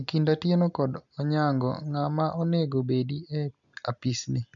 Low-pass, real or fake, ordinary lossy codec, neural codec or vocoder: 7.2 kHz; real; MP3, 96 kbps; none